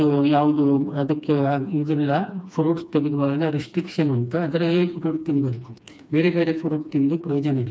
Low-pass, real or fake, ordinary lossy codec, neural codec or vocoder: none; fake; none; codec, 16 kHz, 2 kbps, FreqCodec, smaller model